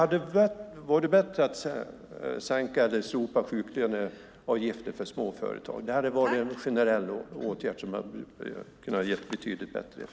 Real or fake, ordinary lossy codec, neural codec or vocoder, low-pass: real; none; none; none